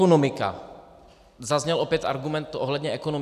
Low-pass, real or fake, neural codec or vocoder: 14.4 kHz; real; none